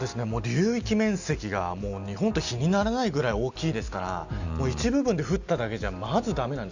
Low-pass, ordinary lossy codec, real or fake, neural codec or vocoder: 7.2 kHz; none; real; none